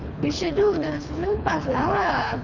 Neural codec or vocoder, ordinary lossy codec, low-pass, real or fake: codec, 24 kHz, 3 kbps, HILCodec; none; 7.2 kHz; fake